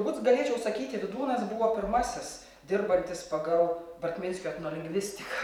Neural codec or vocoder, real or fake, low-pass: vocoder, 44.1 kHz, 128 mel bands every 512 samples, BigVGAN v2; fake; 19.8 kHz